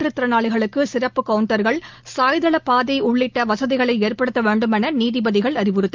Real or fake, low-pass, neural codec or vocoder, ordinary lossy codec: real; 7.2 kHz; none; Opus, 32 kbps